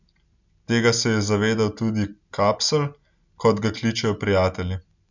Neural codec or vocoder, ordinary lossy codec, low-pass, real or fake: none; none; 7.2 kHz; real